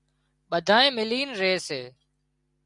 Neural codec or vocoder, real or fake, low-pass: none; real; 10.8 kHz